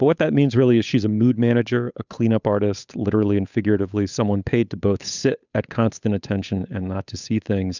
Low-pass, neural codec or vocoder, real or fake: 7.2 kHz; codec, 16 kHz, 8 kbps, FunCodec, trained on Chinese and English, 25 frames a second; fake